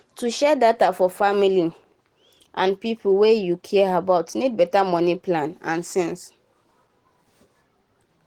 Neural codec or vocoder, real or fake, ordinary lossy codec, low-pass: none; real; Opus, 16 kbps; 19.8 kHz